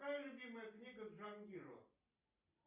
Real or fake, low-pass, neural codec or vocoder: real; 3.6 kHz; none